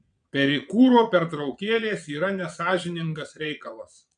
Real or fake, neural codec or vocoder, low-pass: fake; vocoder, 22.05 kHz, 80 mel bands, Vocos; 9.9 kHz